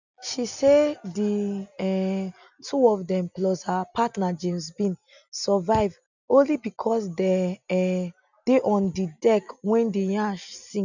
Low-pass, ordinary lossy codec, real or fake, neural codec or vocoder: 7.2 kHz; none; real; none